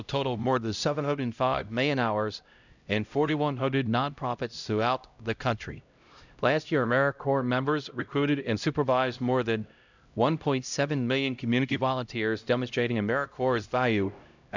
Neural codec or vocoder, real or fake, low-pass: codec, 16 kHz, 0.5 kbps, X-Codec, HuBERT features, trained on LibriSpeech; fake; 7.2 kHz